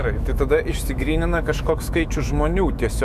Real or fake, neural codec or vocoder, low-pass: fake; autoencoder, 48 kHz, 128 numbers a frame, DAC-VAE, trained on Japanese speech; 14.4 kHz